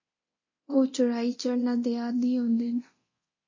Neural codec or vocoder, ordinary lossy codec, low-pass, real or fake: codec, 24 kHz, 0.9 kbps, DualCodec; MP3, 32 kbps; 7.2 kHz; fake